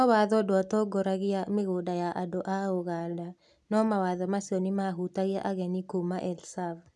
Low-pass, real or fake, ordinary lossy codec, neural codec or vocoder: none; real; none; none